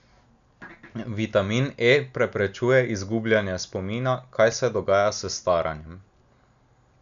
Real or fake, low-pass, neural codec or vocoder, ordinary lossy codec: real; 7.2 kHz; none; none